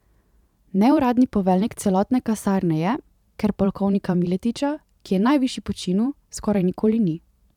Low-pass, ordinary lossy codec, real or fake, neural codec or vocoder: 19.8 kHz; none; fake; vocoder, 44.1 kHz, 128 mel bands every 512 samples, BigVGAN v2